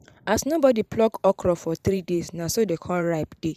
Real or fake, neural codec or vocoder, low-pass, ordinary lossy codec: fake; vocoder, 44.1 kHz, 128 mel bands every 512 samples, BigVGAN v2; 14.4 kHz; none